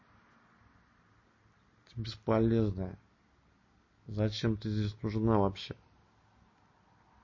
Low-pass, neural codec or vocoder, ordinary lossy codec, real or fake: 7.2 kHz; none; MP3, 32 kbps; real